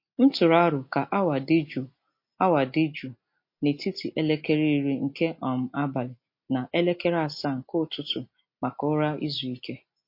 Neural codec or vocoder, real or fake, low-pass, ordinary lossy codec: none; real; 5.4 kHz; MP3, 32 kbps